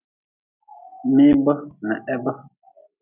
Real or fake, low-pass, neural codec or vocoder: real; 3.6 kHz; none